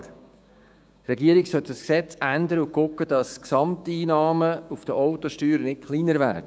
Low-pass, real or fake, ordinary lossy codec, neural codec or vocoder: none; fake; none; codec, 16 kHz, 6 kbps, DAC